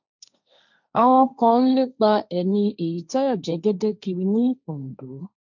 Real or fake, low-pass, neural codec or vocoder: fake; 7.2 kHz; codec, 16 kHz, 1.1 kbps, Voila-Tokenizer